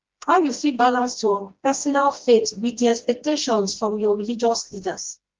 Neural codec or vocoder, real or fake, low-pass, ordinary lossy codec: codec, 16 kHz, 1 kbps, FreqCodec, smaller model; fake; 7.2 kHz; Opus, 32 kbps